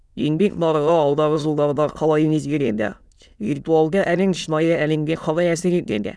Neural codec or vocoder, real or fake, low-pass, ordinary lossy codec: autoencoder, 22.05 kHz, a latent of 192 numbers a frame, VITS, trained on many speakers; fake; none; none